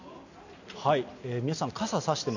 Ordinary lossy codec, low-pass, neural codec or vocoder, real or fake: none; 7.2 kHz; none; real